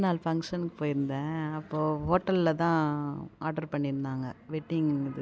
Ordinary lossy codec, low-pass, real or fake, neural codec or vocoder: none; none; real; none